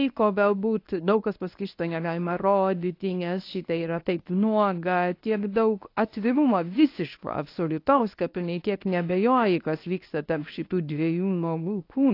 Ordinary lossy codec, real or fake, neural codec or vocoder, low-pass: AAC, 32 kbps; fake; codec, 24 kHz, 0.9 kbps, WavTokenizer, medium speech release version 1; 5.4 kHz